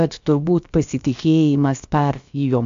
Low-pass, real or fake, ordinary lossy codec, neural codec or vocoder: 7.2 kHz; fake; AAC, 64 kbps; codec, 16 kHz, about 1 kbps, DyCAST, with the encoder's durations